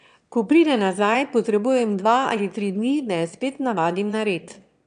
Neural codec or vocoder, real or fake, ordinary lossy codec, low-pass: autoencoder, 22.05 kHz, a latent of 192 numbers a frame, VITS, trained on one speaker; fake; none; 9.9 kHz